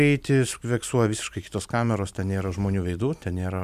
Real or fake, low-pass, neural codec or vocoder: fake; 14.4 kHz; vocoder, 44.1 kHz, 128 mel bands every 512 samples, BigVGAN v2